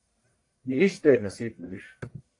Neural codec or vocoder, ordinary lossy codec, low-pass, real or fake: codec, 44.1 kHz, 1.7 kbps, Pupu-Codec; AAC, 48 kbps; 10.8 kHz; fake